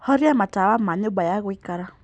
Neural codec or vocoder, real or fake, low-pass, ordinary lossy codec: none; real; 9.9 kHz; none